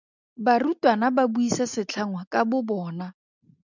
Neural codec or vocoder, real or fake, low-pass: none; real; 7.2 kHz